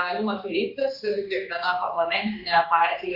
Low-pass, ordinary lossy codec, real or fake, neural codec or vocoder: 5.4 kHz; Opus, 64 kbps; fake; codec, 16 kHz, 2 kbps, X-Codec, HuBERT features, trained on general audio